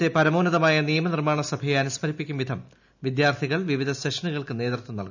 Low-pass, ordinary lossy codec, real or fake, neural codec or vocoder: 7.2 kHz; none; real; none